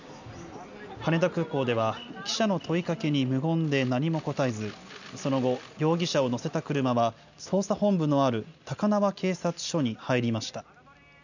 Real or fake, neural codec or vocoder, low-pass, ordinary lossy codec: real; none; 7.2 kHz; none